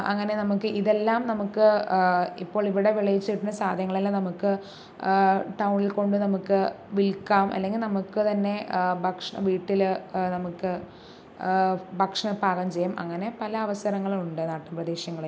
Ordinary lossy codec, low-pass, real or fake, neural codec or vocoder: none; none; real; none